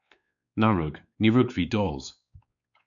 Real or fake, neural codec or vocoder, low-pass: fake; codec, 16 kHz, 4 kbps, X-Codec, WavLM features, trained on Multilingual LibriSpeech; 7.2 kHz